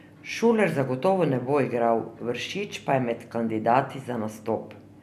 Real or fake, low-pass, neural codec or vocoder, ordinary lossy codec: real; 14.4 kHz; none; none